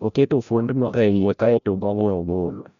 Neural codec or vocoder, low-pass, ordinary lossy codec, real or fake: codec, 16 kHz, 0.5 kbps, FreqCodec, larger model; 7.2 kHz; none; fake